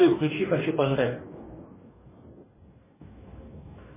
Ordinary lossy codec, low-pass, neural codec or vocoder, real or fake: MP3, 16 kbps; 3.6 kHz; codec, 44.1 kHz, 2.6 kbps, DAC; fake